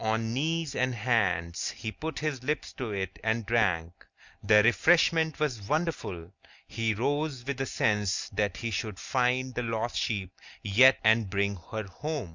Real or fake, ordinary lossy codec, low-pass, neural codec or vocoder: real; Opus, 64 kbps; 7.2 kHz; none